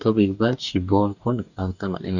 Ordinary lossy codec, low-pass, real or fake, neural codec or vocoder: none; 7.2 kHz; fake; codec, 44.1 kHz, 2.6 kbps, DAC